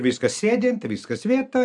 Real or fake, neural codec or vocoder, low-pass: real; none; 10.8 kHz